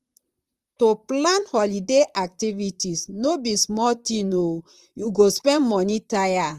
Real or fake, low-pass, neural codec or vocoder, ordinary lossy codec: fake; 14.4 kHz; vocoder, 44.1 kHz, 128 mel bands, Pupu-Vocoder; Opus, 32 kbps